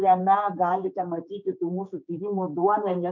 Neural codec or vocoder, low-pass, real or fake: codec, 16 kHz, 4 kbps, X-Codec, HuBERT features, trained on general audio; 7.2 kHz; fake